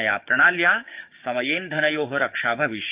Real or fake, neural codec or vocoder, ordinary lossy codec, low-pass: fake; codec, 16 kHz, 6 kbps, DAC; Opus, 32 kbps; 3.6 kHz